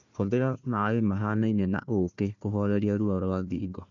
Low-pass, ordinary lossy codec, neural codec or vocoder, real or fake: 7.2 kHz; none; codec, 16 kHz, 1 kbps, FunCodec, trained on Chinese and English, 50 frames a second; fake